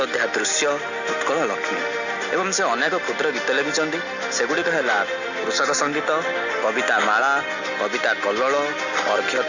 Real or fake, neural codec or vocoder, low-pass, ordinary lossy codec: real; none; 7.2 kHz; none